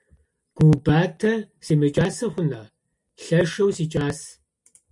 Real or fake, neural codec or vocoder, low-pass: real; none; 10.8 kHz